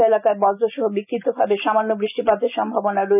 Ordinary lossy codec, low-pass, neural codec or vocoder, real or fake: none; 3.6 kHz; none; real